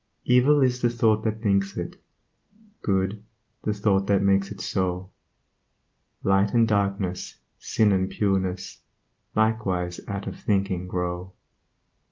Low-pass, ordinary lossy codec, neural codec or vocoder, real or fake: 7.2 kHz; Opus, 24 kbps; none; real